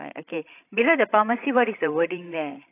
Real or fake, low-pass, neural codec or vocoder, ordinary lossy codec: fake; 3.6 kHz; codec, 16 kHz, 16 kbps, FreqCodec, larger model; AAC, 24 kbps